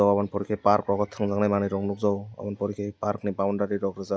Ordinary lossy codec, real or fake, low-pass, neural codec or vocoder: none; real; none; none